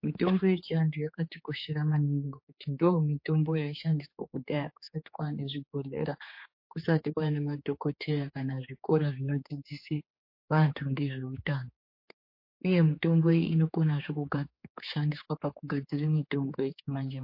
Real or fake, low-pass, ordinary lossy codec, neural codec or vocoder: fake; 5.4 kHz; MP3, 32 kbps; codec, 16 kHz, 4 kbps, X-Codec, HuBERT features, trained on general audio